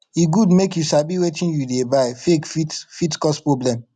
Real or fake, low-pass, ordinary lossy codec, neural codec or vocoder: real; 10.8 kHz; none; none